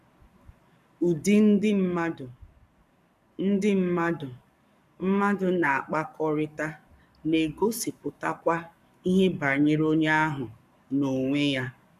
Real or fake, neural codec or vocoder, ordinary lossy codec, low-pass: fake; codec, 44.1 kHz, 7.8 kbps, Pupu-Codec; none; 14.4 kHz